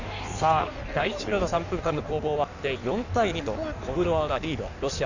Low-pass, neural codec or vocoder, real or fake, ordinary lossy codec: 7.2 kHz; codec, 16 kHz in and 24 kHz out, 1.1 kbps, FireRedTTS-2 codec; fake; none